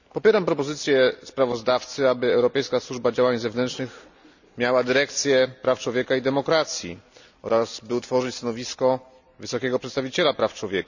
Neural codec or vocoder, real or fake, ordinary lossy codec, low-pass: none; real; none; 7.2 kHz